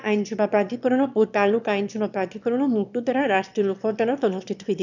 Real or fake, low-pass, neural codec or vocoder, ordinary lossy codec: fake; 7.2 kHz; autoencoder, 22.05 kHz, a latent of 192 numbers a frame, VITS, trained on one speaker; none